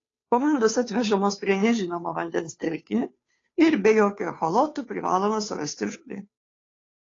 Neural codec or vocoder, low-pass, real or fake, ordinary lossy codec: codec, 16 kHz, 2 kbps, FunCodec, trained on Chinese and English, 25 frames a second; 7.2 kHz; fake; AAC, 32 kbps